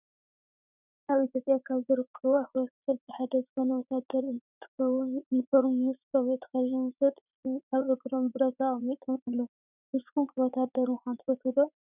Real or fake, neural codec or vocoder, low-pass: fake; vocoder, 44.1 kHz, 80 mel bands, Vocos; 3.6 kHz